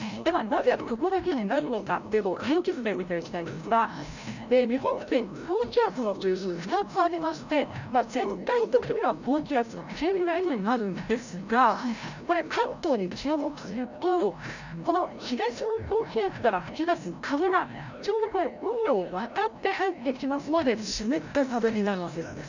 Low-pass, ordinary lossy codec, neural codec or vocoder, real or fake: 7.2 kHz; none; codec, 16 kHz, 0.5 kbps, FreqCodec, larger model; fake